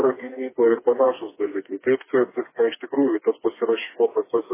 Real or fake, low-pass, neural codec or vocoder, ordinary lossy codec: fake; 3.6 kHz; codec, 16 kHz, 2 kbps, FreqCodec, smaller model; MP3, 16 kbps